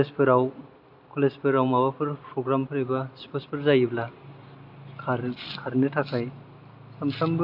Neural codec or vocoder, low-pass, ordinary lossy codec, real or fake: none; 5.4 kHz; AAC, 48 kbps; real